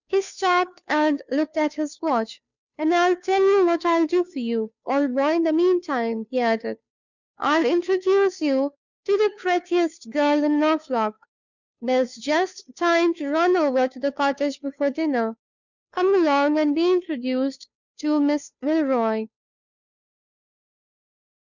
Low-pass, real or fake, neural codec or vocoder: 7.2 kHz; fake; codec, 16 kHz, 2 kbps, FunCodec, trained on Chinese and English, 25 frames a second